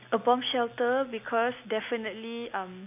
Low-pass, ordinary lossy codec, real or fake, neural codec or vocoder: 3.6 kHz; AAC, 32 kbps; real; none